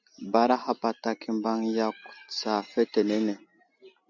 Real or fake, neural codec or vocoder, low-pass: real; none; 7.2 kHz